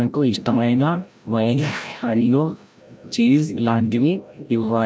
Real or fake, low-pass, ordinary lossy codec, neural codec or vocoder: fake; none; none; codec, 16 kHz, 0.5 kbps, FreqCodec, larger model